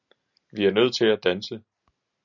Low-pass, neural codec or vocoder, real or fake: 7.2 kHz; none; real